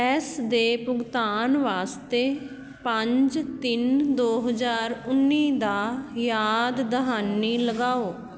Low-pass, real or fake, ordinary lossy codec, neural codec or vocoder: none; real; none; none